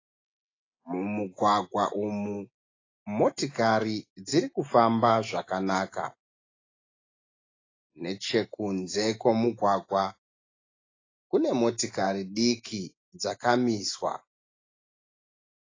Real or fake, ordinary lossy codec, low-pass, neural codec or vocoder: real; AAC, 32 kbps; 7.2 kHz; none